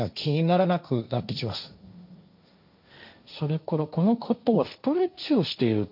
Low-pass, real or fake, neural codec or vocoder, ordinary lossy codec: 5.4 kHz; fake; codec, 16 kHz, 1.1 kbps, Voila-Tokenizer; none